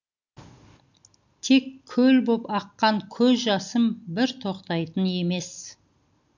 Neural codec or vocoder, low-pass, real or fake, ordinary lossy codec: none; 7.2 kHz; real; none